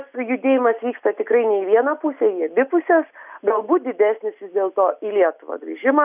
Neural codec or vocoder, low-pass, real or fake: none; 3.6 kHz; real